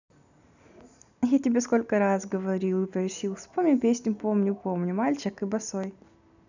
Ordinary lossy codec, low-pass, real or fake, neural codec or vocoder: none; 7.2 kHz; real; none